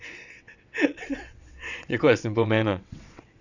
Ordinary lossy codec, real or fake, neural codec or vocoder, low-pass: none; fake; vocoder, 22.05 kHz, 80 mel bands, WaveNeXt; 7.2 kHz